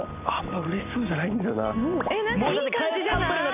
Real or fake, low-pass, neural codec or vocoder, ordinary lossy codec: real; 3.6 kHz; none; none